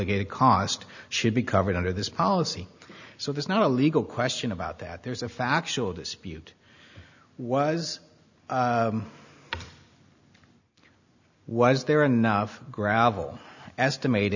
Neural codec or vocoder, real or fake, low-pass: none; real; 7.2 kHz